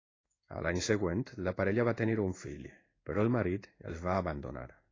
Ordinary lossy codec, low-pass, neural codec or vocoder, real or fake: AAC, 32 kbps; 7.2 kHz; codec, 16 kHz in and 24 kHz out, 1 kbps, XY-Tokenizer; fake